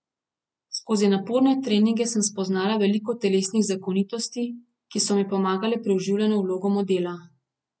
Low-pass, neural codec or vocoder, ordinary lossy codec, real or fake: none; none; none; real